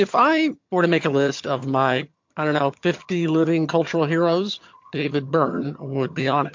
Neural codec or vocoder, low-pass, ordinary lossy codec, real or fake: vocoder, 22.05 kHz, 80 mel bands, HiFi-GAN; 7.2 kHz; MP3, 48 kbps; fake